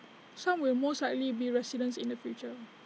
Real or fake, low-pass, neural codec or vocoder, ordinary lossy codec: real; none; none; none